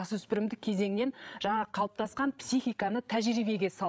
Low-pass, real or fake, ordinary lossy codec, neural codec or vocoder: none; fake; none; codec, 16 kHz, 8 kbps, FreqCodec, larger model